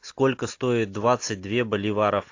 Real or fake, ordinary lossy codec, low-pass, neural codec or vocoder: real; AAC, 48 kbps; 7.2 kHz; none